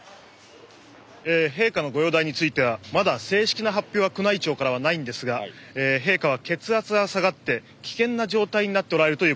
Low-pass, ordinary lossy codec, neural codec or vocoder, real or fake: none; none; none; real